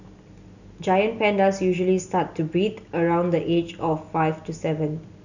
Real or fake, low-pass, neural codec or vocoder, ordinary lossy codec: real; 7.2 kHz; none; none